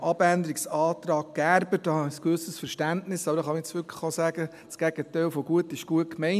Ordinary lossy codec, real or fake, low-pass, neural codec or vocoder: MP3, 96 kbps; real; 14.4 kHz; none